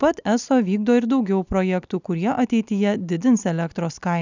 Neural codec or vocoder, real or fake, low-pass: none; real; 7.2 kHz